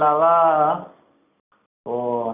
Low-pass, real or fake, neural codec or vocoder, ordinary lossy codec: 3.6 kHz; real; none; none